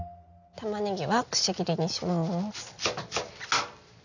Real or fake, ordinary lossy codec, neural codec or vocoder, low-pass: real; none; none; 7.2 kHz